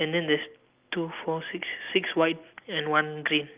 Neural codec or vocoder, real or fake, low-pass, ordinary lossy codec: none; real; 3.6 kHz; Opus, 24 kbps